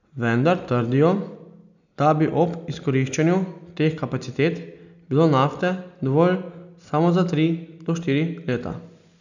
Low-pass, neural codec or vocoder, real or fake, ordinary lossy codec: 7.2 kHz; none; real; none